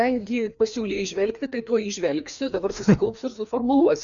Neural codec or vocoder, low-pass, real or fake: codec, 16 kHz, 2 kbps, FreqCodec, larger model; 7.2 kHz; fake